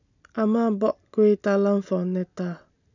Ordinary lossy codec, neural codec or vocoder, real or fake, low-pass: none; none; real; 7.2 kHz